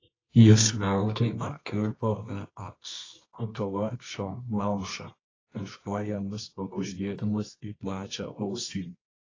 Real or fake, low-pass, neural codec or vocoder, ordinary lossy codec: fake; 7.2 kHz; codec, 24 kHz, 0.9 kbps, WavTokenizer, medium music audio release; AAC, 32 kbps